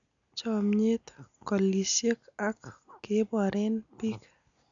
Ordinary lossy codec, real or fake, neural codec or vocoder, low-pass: none; real; none; 7.2 kHz